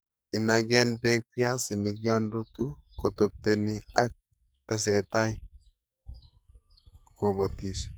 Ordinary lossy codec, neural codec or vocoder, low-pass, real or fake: none; codec, 44.1 kHz, 2.6 kbps, SNAC; none; fake